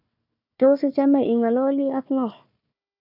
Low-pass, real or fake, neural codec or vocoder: 5.4 kHz; fake; codec, 16 kHz, 1 kbps, FunCodec, trained on Chinese and English, 50 frames a second